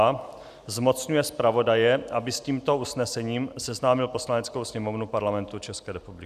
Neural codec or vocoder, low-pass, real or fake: none; 14.4 kHz; real